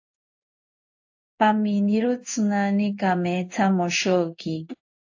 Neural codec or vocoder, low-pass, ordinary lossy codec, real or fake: codec, 16 kHz in and 24 kHz out, 1 kbps, XY-Tokenizer; 7.2 kHz; AAC, 48 kbps; fake